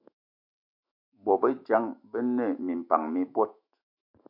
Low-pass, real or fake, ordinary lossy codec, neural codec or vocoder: 5.4 kHz; fake; MP3, 32 kbps; autoencoder, 48 kHz, 128 numbers a frame, DAC-VAE, trained on Japanese speech